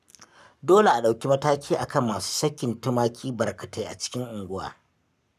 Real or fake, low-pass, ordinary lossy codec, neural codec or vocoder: fake; 14.4 kHz; none; codec, 44.1 kHz, 7.8 kbps, Pupu-Codec